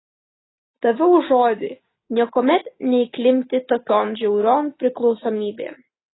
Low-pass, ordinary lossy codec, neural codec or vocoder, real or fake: 7.2 kHz; AAC, 16 kbps; none; real